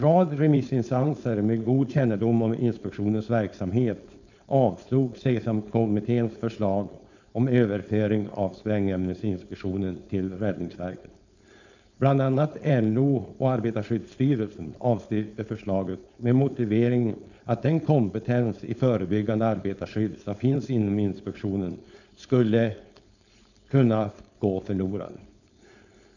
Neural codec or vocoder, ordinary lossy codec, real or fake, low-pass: codec, 16 kHz, 4.8 kbps, FACodec; none; fake; 7.2 kHz